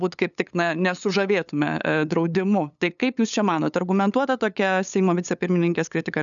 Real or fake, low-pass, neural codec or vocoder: fake; 7.2 kHz; codec, 16 kHz, 8 kbps, FunCodec, trained on LibriTTS, 25 frames a second